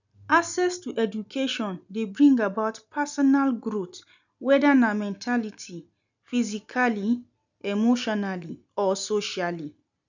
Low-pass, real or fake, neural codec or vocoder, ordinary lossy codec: 7.2 kHz; real; none; none